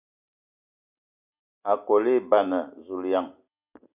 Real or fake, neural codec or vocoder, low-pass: real; none; 3.6 kHz